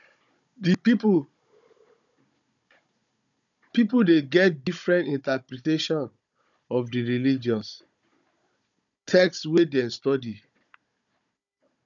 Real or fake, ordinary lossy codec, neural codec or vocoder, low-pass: fake; none; codec, 16 kHz, 16 kbps, FunCodec, trained on Chinese and English, 50 frames a second; 7.2 kHz